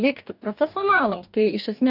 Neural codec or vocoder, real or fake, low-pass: codec, 44.1 kHz, 2.6 kbps, DAC; fake; 5.4 kHz